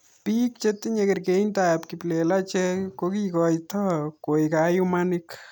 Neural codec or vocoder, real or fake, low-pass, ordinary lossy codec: none; real; none; none